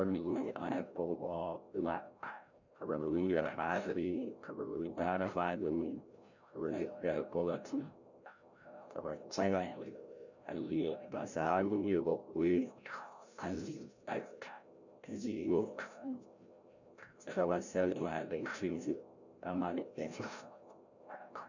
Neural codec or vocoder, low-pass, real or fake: codec, 16 kHz, 0.5 kbps, FreqCodec, larger model; 7.2 kHz; fake